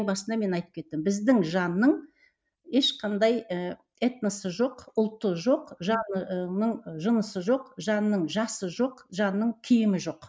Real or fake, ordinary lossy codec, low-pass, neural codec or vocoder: real; none; none; none